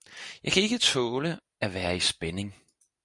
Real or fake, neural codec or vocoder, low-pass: real; none; 10.8 kHz